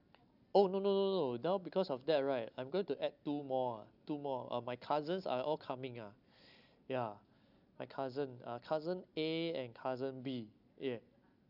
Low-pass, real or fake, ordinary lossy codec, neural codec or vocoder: 5.4 kHz; real; none; none